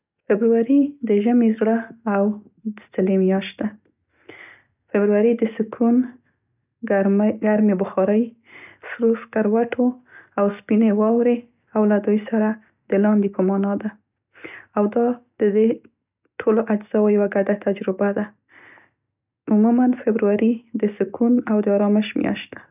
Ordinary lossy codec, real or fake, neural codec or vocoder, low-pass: none; real; none; 3.6 kHz